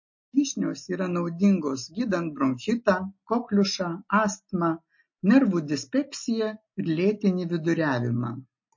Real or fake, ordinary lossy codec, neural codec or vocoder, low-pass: real; MP3, 32 kbps; none; 7.2 kHz